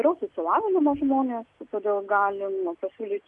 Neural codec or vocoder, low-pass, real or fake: none; 10.8 kHz; real